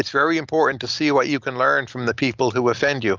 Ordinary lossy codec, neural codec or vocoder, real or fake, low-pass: Opus, 24 kbps; none; real; 7.2 kHz